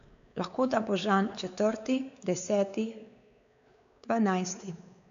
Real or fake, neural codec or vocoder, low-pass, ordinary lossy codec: fake; codec, 16 kHz, 4 kbps, X-Codec, WavLM features, trained on Multilingual LibriSpeech; 7.2 kHz; none